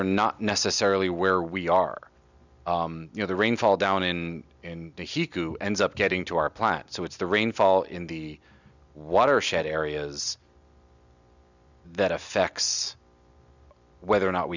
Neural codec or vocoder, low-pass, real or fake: none; 7.2 kHz; real